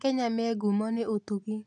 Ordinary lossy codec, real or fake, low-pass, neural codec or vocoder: none; real; 10.8 kHz; none